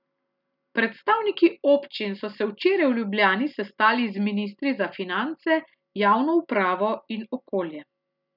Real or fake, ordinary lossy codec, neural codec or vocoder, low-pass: real; none; none; 5.4 kHz